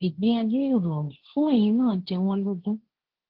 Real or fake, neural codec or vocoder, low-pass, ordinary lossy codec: fake; codec, 16 kHz, 1.1 kbps, Voila-Tokenizer; 5.4 kHz; Opus, 16 kbps